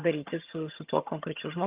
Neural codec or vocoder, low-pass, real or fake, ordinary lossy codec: vocoder, 22.05 kHz, 80 mel bands, HiFi-GAN; 3.6 kHz; fake; Opus, 32 kbps